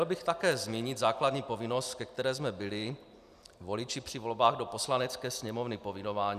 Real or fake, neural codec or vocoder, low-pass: real; none; 14.4 kHz